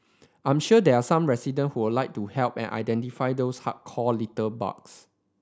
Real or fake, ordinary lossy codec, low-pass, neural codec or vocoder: real; none; none; none